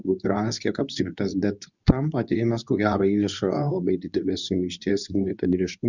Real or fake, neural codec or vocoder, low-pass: fake; codec, 24 kHz, 0.9 kbps, WavTokenizer, medium speech release version 2; 7.2 kHz